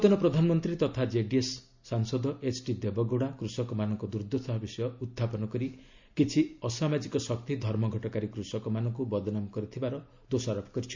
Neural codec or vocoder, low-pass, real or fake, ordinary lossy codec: none; 7.2 kHz; real; MP3, 64 kbps